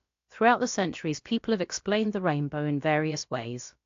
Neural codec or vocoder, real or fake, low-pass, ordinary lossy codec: codec, 16 kHz, about 1 kbps, DyCAST, with the encoder's durations; fake; 7.2 kHz; AAC, 48 kbps